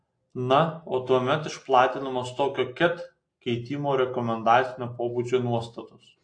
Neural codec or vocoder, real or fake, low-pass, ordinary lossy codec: none; real; 9.9 kHz; AAC, 48 kbps